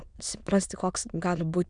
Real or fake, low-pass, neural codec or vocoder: fake; 9.9 kHz; autoencoder, 22.05 kHz, a latent of 192 numbers a frame, VITS, trained on many speakers